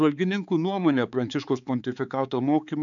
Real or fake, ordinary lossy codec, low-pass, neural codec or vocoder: fake; MP3, 96 kbps; 7.2 kHz; codec, 16 kHz, 4 kbps, X-Codec, HuBERT features, trained on balanced general audio